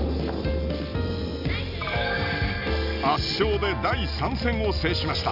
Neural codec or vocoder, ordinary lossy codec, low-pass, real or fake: none; none; 5.4 kHz; real